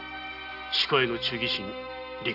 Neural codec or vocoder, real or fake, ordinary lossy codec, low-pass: none; real; none; 5.4 kHz